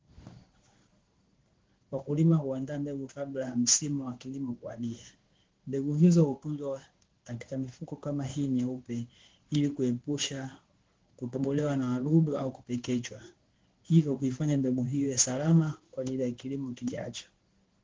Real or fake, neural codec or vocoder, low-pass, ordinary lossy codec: fake; codec, 16 kHz in and 24 kHz out, 1 kbps, XY-Tokenizer; 7.2 kHz; Opus, 16 kbps